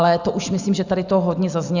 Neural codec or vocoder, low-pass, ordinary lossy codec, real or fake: none; 7.2 kHz; Opus, 64 kbps; real